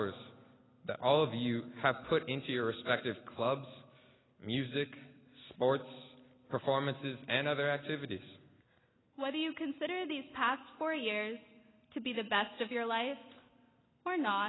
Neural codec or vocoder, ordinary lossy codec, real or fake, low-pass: none; AAC, 16 kbps; real; 7.2 kHz